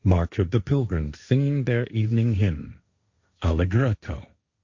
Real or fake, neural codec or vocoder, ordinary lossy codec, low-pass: fake; codec, 16 kHz, 1.1 kbps, Voila-Tokenizer; Opus, 64 kbps; 7.2 kHz